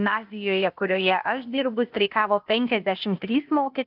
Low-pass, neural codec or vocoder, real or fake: 5.4 kHz; codec, 16 kHz, 0.8 kbps, ZipCodec; fake